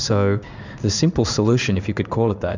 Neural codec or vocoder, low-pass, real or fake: codec, 16 kHz in and 24 kHz out, 1 kbps, XY-Tokenizer; 7.2 kHz; fake